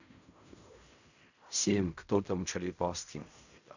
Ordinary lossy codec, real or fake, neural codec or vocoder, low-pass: none; fake; codec, 16 kHz in and 24 kHz out, 0.4 kbps, LongCat-Audio-Codec, fine tuned four codebook decoder; 7.2 kHz